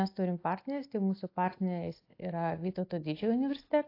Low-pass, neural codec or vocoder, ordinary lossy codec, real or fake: 5.4 kHz; none; AAC, 32 kbps; real